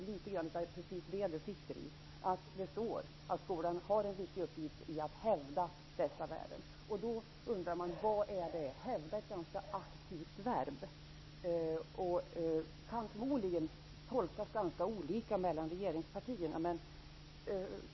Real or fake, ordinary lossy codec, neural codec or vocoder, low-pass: real; MP3, 24 kbps; none; 7.2 kHz